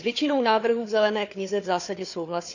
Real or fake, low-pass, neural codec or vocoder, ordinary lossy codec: fake; 7.2 kHz; codec, 16 kHz, 4 kbps, FunCodec, trained on Chinese and English, 50 frames a second; none